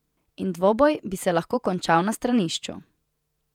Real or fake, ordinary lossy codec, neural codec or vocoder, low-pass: fake; none; vocoder, 48 kHz, 128 mel bands, Vocos; 19.8 kHz